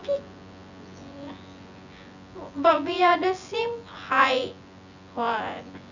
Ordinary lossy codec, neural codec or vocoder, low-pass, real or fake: none; vocoder, 24 kHz, 100 mel bands, Vocos; 7.2 kHz; fake